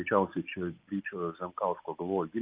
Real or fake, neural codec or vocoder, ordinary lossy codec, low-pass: real; none; Opus, 32 kbps; 3.6 kHz